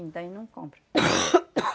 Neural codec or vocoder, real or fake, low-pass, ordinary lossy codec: none; real; none; none